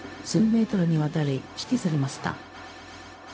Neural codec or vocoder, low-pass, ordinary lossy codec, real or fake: codec, 16 kHz, 0.4 kbps, LongCat-Audio-Codec; none; none; fake